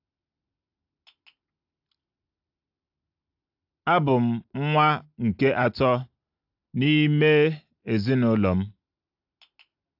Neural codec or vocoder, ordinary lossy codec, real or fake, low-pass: none; AAC, 48 kbps; real; 5.4 kHz